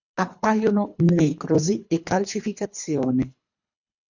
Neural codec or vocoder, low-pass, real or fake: codec, 24 kHz, 3 kbps, HILCodec; 7.2 kHz; fake